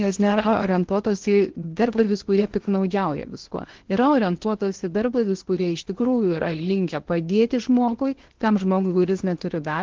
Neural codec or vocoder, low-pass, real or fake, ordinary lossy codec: codec, 16 kHz in and 24 kHz out, 0.8 kbps, FocalCodec, streaming, 65536 codes; 7.2 kHz; fake; Opus, 16 kbps